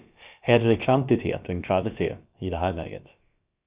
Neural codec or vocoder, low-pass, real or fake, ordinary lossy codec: codec, 16 kHz, about 1 kbps, DyCAST, with the encoder's durations; 3.6 kHz; fake; Opus, 64 kbps